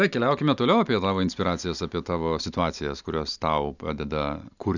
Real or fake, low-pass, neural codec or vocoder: real; 7.2 kHz; none